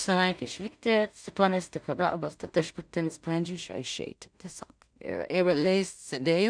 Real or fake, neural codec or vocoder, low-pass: fake; codec, 16 kHz in and 24 kHz out, 0.4 kbps, LongCat-Audio-Codec, two codebook decoder; 9.9 kHz